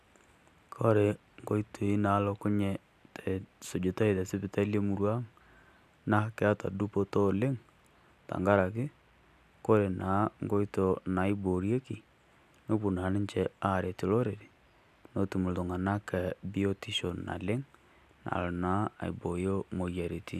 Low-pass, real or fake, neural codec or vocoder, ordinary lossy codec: 14.4 kHz; real; none; none